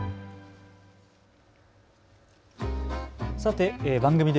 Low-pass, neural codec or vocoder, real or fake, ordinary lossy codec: none; none; real; none